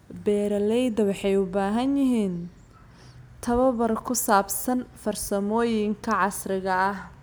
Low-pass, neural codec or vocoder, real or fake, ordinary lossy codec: none; none; real; none